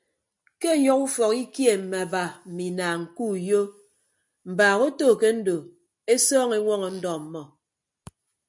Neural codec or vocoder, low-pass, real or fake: none; 10.8 kHz; real